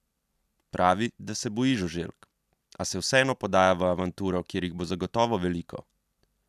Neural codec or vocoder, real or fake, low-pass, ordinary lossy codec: none; real; 14.4 kHz; none